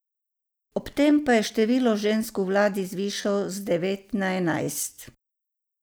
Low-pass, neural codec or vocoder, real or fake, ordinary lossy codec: none; none; real; none